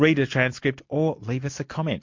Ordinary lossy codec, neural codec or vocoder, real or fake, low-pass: MP3, 48 kbps; none; real; 7.2 kHz